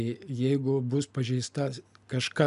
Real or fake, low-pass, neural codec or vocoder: real; 10.8 kHz; none